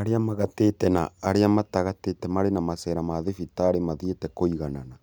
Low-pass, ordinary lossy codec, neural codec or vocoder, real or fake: none; none; none; real